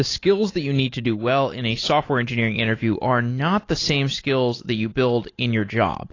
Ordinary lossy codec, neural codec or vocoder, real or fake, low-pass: AAC, 32 kbps; none; real; 7.2 kHz